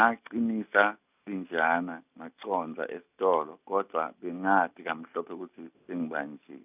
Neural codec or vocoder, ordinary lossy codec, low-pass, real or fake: none; none; 3.6 kHz; real